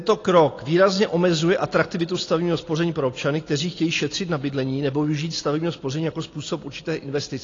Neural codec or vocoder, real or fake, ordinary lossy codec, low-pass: none; real; AAC, 32 kbps; 7.2 kHz